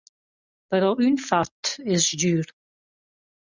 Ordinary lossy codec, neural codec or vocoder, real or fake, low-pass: Opus, 64 kbps; none; real; 7.2 kHz